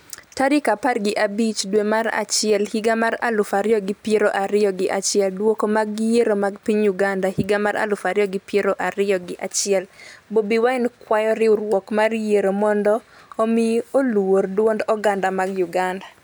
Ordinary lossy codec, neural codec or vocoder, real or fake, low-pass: none; none; real; none